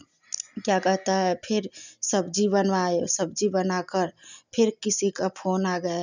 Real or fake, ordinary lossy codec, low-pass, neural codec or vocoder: real; none; 7.2 kHz; none